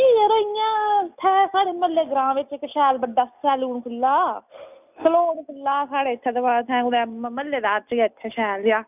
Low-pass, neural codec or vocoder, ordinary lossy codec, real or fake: 3.6 kHz; none; none; real